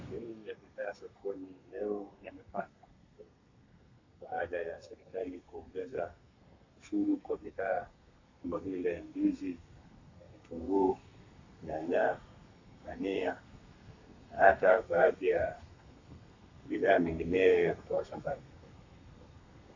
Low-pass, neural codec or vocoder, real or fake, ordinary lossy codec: 7.2 kHz; codec, 32 kHz, 1.9 kbps, SNAC; fake; AAC, 48 kbps